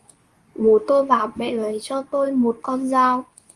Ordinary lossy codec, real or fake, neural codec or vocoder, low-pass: Opus, 32 kbps; fake; codec, 24 kHz, 0.9 kbps, WavTokenizer, medium speech release version 2; 10.8 kHz